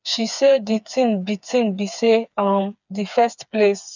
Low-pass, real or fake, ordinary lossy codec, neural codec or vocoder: 7.2 kHz; fake; none; codec, 16 kHz, 4 kbps, FreqCodec, smaller model